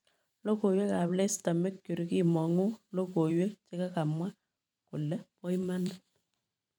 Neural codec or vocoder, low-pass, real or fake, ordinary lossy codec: vocoder, 44.1 kHz, 128 mel bands every 512 samples, BigVGAN v2; none; fake; none